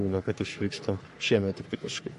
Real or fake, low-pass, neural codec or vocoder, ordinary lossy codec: fake; 14.4 kHz; codec, 44.1 kHz, 3.4 kbps, Pupu-Codec; MP3, 48 kbps